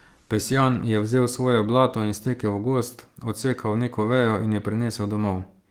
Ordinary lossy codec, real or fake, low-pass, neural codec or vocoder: Opus, 24 kbps; fake; 19.8 kHz; codec, 44.1 kHz, 7.8 kbps, DAC